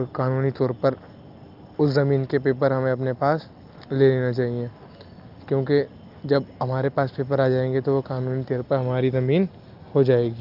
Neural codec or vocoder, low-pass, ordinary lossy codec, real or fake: none; 5.4 kHz; Opus, 24 kbps; real